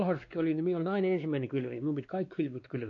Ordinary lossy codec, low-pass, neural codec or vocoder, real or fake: AAC, 48 kbps; 7.2 kHz; codec, 16 kHz, 2 kbps, X-Codec, WavLM features, trained on Multilingual LibriSpeech; fake